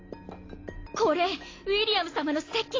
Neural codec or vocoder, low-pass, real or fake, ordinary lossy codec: none; 7.2 kHz; real; AAC, 32 kbps